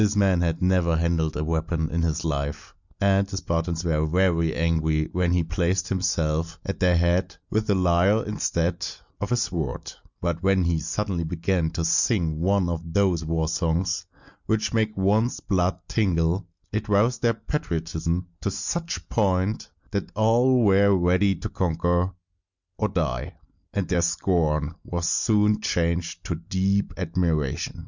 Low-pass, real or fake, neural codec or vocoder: 7.2 kHz; real; none